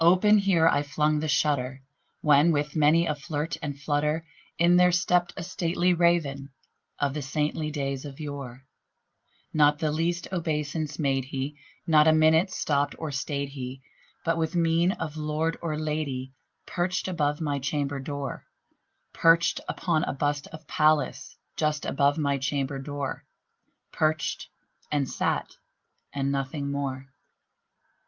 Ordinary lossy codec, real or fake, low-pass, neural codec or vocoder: Opus, 32 kbps; real; 7.2 kHz; none